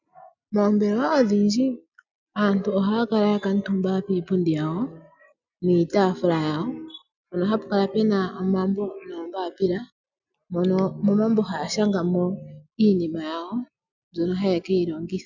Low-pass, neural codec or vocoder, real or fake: 7.2 kHz; none; real